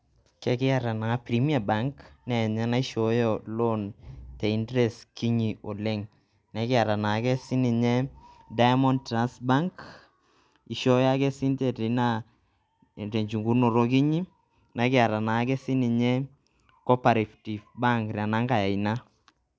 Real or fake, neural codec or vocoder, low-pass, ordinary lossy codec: real; none; none; none